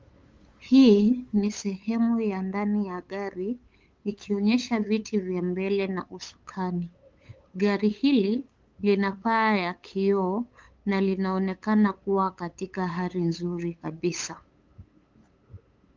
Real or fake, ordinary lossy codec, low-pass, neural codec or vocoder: fake; Opus, 32 kbps; 7.2 kHz; codec, 16 kHz, 8 kbps, FunCodec, trained on LibriTTS, 25 frames a second